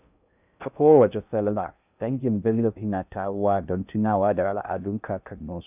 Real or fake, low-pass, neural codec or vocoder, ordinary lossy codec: fake; 3.6 kHz; codec, 16 kHz in and 24 kHz out, 0.6 kbps, FocalCodec, streaming, 2048 codes; none